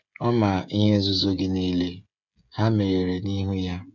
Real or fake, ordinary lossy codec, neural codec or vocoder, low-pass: fake; none; codec, 16 kHz, 16 kbps, FreqCodec, smaller model; 7.2 kHz